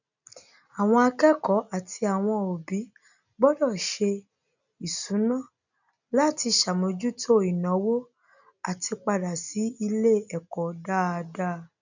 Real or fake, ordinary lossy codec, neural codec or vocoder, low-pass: real; none; none; 7.2 kHz